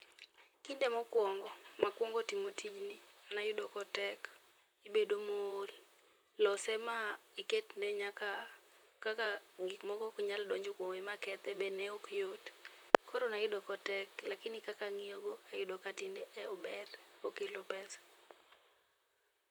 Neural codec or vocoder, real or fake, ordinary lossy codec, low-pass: vocoder, 44.1 kHz, 128 mel bands, Pupu-Vocoder; fake; none; none